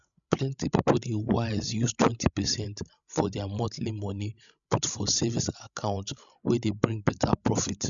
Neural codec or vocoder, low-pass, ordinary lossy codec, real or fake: codec, 16 kHz, 16 kbps, FreqCodec, larger model; 7.2 kHz; none; fake